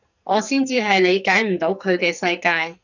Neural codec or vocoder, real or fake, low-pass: codec, 44.1 kHz, 2.6 kbps, SNAC; fake; 7.2 kHz